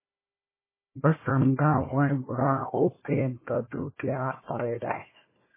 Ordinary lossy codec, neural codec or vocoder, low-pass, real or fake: MP3, 16 kbps; codec, 16 kHz, 1 kbps, FunCodec, trained on Chinese and English, 50 frames a second; 3.6 kHz; fake